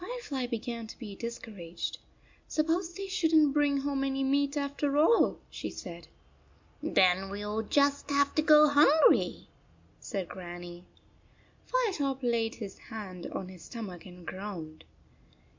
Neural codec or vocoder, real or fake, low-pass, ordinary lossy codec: none; real; 7.2 kHz; MP3, 64 kbps